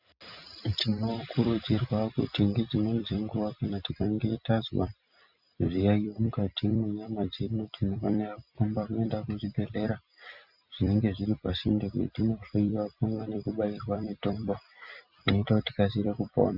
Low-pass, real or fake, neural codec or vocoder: 5.4 kHz; fake; vocoder, 44.1 kHz, 128 mel bands every 256 samples, BigVGAN v2